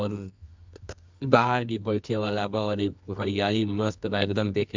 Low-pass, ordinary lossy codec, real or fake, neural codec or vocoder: 7.2 kHz; MP3, 64 kbps; fake; codec, 24 kHz, 0.9 kbps, WavTokenizer, medium music audio release